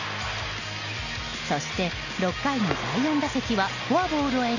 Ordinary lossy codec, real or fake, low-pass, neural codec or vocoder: none; real; 7.2 kHz; none